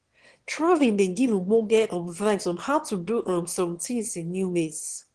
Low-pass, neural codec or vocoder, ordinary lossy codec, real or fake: 9.9 kHz; autoencoder, 22.05 kHz, a latent of 192 numbers a frame, VITS, trained on one speaker; Opus, 16 kbps; fake